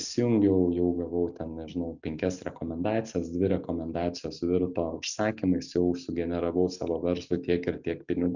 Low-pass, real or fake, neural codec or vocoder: 7.2 kHz; real; none